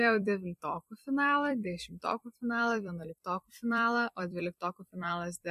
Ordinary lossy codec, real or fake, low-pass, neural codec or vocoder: MP3, 64 kbps; fake; 14.4 kHz; vocoder, 44.1 kHz, 128 mel bands every 256 samples, BigVGAN v2